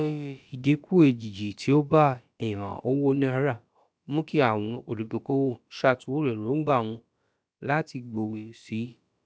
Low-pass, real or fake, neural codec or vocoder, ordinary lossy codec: none; fake; codec, 16 kHz, about 1 kbps, DyCAST, with the encoder's durations; none